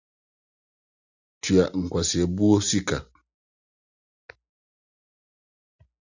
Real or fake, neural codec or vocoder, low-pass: real; none; 7.2 kHz